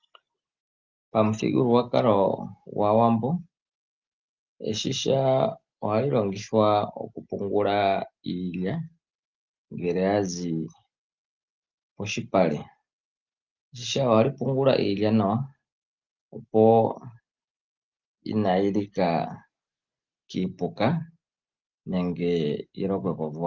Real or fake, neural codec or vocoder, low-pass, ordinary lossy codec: real; none; 7.2 kHz; Opus, 32 kbps